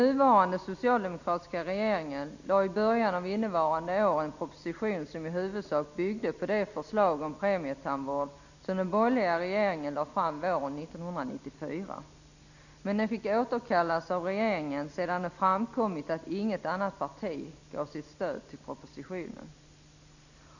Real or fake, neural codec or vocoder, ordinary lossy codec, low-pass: real; none; none; 7.2 kHz